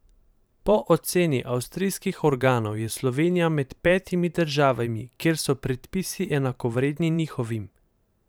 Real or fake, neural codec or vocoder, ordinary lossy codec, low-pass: fake; vocoder, 44.1 kHz, 128 mel bands every 512 samples, BigVGAN v2; none; none